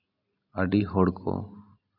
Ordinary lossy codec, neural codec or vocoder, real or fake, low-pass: none; none; real; 5.4 kHz